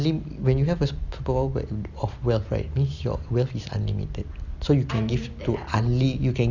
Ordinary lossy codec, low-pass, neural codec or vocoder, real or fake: none; 7.2 kHz; none; real